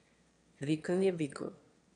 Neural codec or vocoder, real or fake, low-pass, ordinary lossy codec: autoencoder, 22.05 kHz, a latent of 192 numbers a frame, VITS, trained on one speaker; fake; 9.9 kHz; AAC, 64 kbps